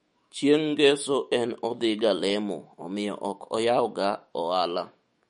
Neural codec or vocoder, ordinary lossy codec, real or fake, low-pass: autoencoder, 48 kHz, 128 numbers a frame, DAC-VAE, trained on Japanese speech; MP3, 48 kbps; fake; 19.8 kHz